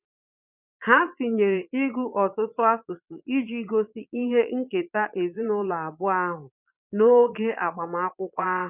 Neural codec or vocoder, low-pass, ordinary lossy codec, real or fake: vocoder, 22.05 kHz, 80 mel bands, Vocos; 3.6 kHz; none; fake